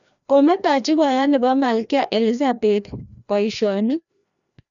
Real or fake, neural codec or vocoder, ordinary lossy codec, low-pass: fake; codec, 16 kHz, 1 kbps, FreqCodec, larger model; none; 7.2 kHz